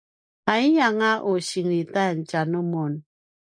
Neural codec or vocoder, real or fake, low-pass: none; real; 9.9 kHz